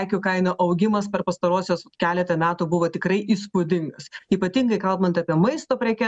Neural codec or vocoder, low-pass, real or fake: none; 9.9 kHz; real